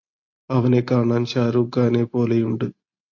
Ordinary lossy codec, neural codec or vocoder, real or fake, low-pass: AAC, 48 kbps; none; real; 7.2 kHz